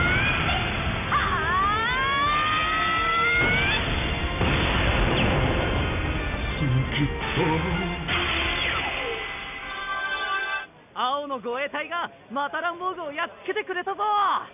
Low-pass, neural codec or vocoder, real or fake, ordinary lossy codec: 3.6 kHz; none; real; none